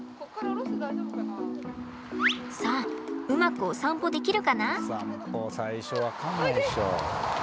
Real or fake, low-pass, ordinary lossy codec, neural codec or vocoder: real; none; none; none